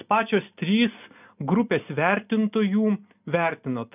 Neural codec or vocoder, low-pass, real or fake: none; 3.6 kHz; real